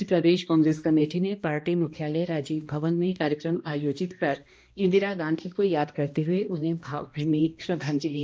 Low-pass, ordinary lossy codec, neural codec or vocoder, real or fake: none; none; codec, 16 kHz, 1 kbps, X-Codec, HuBERT features, trained on balanced general audio; fake